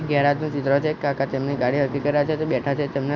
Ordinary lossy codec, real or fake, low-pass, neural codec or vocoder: Opus, 64 kbps; real; 7.2 kHz; none